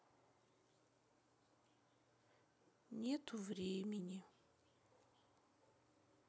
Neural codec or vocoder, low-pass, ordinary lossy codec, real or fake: none; none; none; real